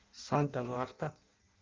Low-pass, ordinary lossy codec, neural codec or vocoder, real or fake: 7.2 kHz; Opus, 32 kbps; codec, 16 kHz in and 24 kHz out, 0.6 kbps, FireRedTTS-2 codec; fake